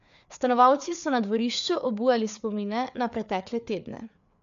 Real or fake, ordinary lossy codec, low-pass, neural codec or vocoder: fake; MP3, 64 kbps; 7.2 kHz; codec, 16 kHz, 4 kbps, FreqCodec, larger model